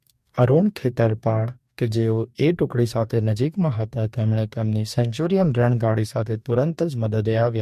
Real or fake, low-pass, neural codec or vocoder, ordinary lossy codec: fake; 14.4 kHz; codec, 44.1 kHz, 2.6 kbps, DAC; MP3, 64 kbps